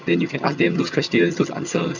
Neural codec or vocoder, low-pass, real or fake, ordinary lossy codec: vocoder, 22.05 kHz, 80 mel bands, HiFi-GAN; 7.2 kHz; fake; none